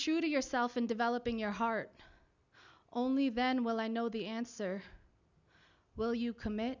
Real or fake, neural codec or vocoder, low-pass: real; none; 7.2 kHz